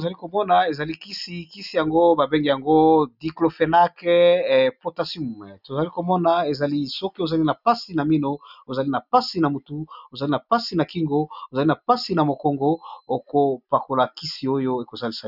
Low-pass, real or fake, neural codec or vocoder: 5.4 kHz; real; none